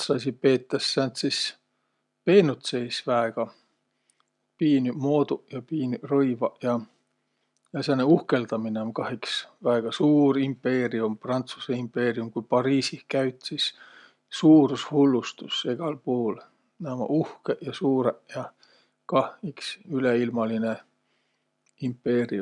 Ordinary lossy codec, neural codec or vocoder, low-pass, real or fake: none; none; 10.8 kHz; real